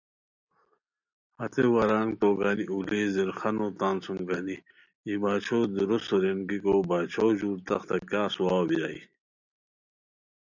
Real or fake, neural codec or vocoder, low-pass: real; none; 7.2 kHz